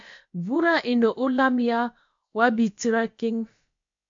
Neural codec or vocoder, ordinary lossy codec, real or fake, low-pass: codec, 16 kHz, about 1 kbps, DyCAST, with the encoder's durations; MP3, 48 kbps; fake; 7.2 kHz